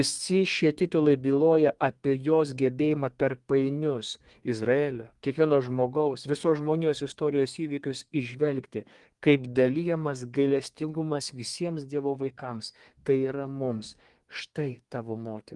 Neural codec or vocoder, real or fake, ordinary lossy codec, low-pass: codec, 32 kHz, 1.9 kbps, SNAC; fake; Opus, 24 kbps; 10.8 kHz